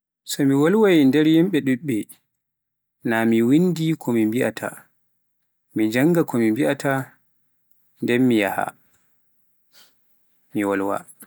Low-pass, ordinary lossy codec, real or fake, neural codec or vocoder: none; none; real; none